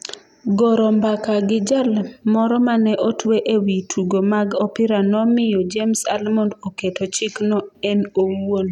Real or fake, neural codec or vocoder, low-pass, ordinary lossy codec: real; none; 19.8 kHz; none